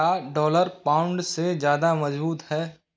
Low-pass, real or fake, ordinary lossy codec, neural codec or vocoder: none; real; none; none